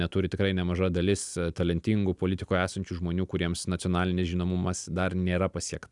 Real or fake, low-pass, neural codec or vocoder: real; 10.8 kHz; none